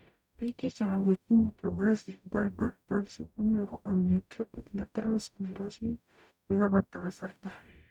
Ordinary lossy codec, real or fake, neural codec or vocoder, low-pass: none; fake; codec, 44.1 kHz, 0.9 kbps, DAC; 19.8 kHz